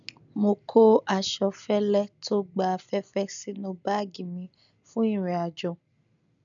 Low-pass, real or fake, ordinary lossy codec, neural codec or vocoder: 7.2 kHz; real; none; none